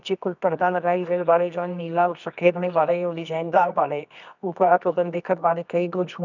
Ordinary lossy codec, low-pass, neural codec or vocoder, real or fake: none; 7.2 kHz; codec, 24 kHz, 0.9 kbps, WavTokenizer, medium music audio release; fake